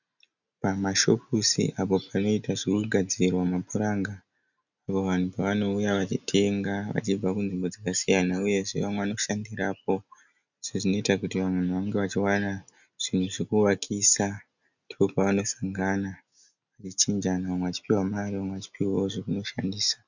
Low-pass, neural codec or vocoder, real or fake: 7.2 kHz; none; real